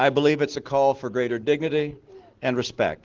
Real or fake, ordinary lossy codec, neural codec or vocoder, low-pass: real; Opus, 16 kbps; none; 7.2 kHz